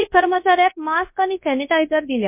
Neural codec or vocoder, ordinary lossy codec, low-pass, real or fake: codec, 24 kHz, 0.9 kbps, WavTokenizer, large speech release; MP3, 24 kbps; 3.6 kHz; fake